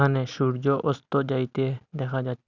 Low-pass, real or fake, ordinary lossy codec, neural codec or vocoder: 7.2 kHz; real; none; none